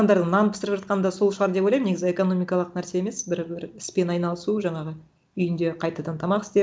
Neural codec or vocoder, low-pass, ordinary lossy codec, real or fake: none; none; none; real